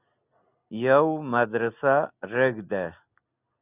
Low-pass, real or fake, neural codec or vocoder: 3.6 kHz; real; none